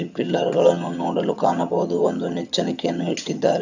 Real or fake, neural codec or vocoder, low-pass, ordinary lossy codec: fake; vocoder, 22.05 kHz, 80 mel bands, HiFi-GAN; 7.2 kHz; none